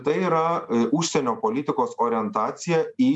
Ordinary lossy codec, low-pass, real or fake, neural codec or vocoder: MP3, 96 kbps; 10.8 kHz; real; none